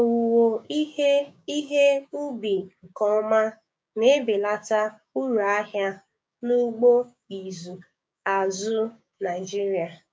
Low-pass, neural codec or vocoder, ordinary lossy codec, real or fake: none; codec, 16 kHz, 6 kbps, DAC; none; fake